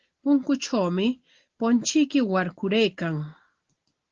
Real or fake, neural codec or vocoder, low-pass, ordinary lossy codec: real; none; 7.2 kHz; Opus, 16 kbps